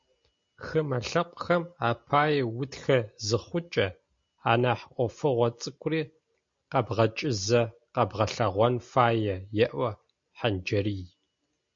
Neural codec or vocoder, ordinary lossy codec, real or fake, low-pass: none; MP3, 48 kbps; real; 7.2 kHz